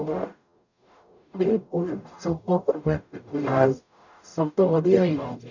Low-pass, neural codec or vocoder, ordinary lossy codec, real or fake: 7.2 kHz; codec, 44.1 kHz, 0.9 kbps, DAC; none; fake